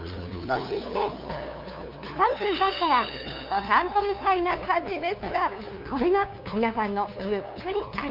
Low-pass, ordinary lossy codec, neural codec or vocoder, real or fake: 5.4 kHz; none; codec, 16 kHz, 2 kbps, FunCodec, trained on LibriTTS, 25 frames a second; fake